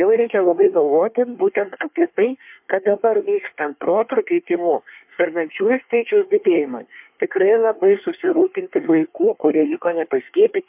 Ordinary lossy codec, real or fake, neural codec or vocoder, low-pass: MP3, 32 kbps; fake; codec, 24 kHz, 1 kbps, SNAC; 3.6 kHz